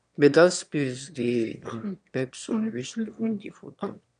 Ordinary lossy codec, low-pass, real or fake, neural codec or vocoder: none; 9.9 kHz; fake; autoencoder, 22.05 kHz, a latent of 192 numbers a frame, VITS, trained on one speaker